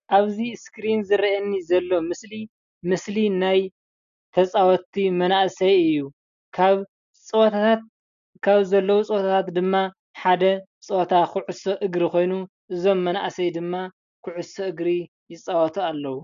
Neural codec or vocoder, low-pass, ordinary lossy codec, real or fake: none; 7.2 kHz; AAC, 96 kbps; real